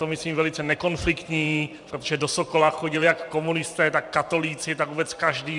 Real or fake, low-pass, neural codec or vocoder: fake; 10.8 kHz; vocoder, 44.1 kHz, 128 mel bands every 512 samples, BigVGAN v2